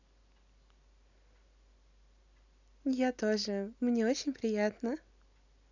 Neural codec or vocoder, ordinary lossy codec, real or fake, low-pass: none; none; real; 7.2 kHz